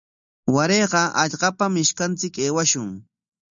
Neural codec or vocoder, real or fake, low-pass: none; real; 7.2 kHz